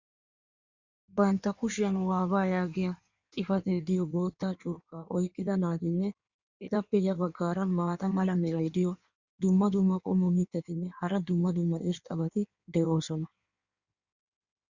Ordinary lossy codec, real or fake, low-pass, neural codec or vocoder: Opus, 64 kbps; fake; 7.2 kHz; codec, 16 kHz in and 24 kHz out, 1.1 kbps, FireRedTTS-2 codec